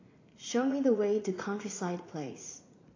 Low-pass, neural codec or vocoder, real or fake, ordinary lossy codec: 7.2 kHz; vocoder, 44.1 kHz, 80 mel bands, Vocos; fake; AAC, 32 kbps